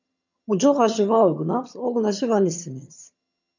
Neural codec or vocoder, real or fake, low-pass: vocoder, 22.05 kHz, 80 mel bands, HiFi-GAN; fake; 7.2 kHz